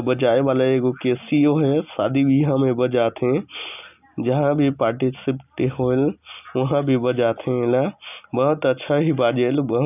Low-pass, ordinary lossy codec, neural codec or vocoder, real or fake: 3.6 kHz; none; none; real